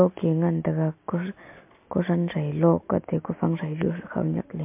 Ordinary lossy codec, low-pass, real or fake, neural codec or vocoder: MP3, 32 kbps; 3.6 kHz; real; none